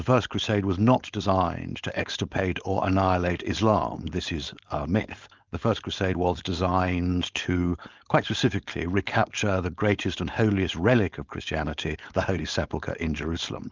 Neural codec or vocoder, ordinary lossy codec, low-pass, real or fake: codec, 16 kHz, 4.8 kbps, FACodec; Opus, 24 kbps; 7.2 kHz; fake